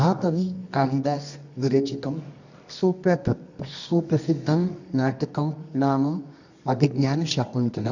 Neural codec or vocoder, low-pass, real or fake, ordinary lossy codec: codec, 24 kHz, 0.9 kbps, WavTokenizer, medium music audio release; 7.2 kHz; fake; none